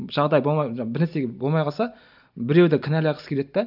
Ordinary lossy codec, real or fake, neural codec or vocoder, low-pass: none; real; none; 5.4 kHz